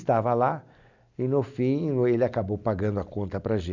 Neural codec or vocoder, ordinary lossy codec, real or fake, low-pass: none; none; real; 7.2 kHz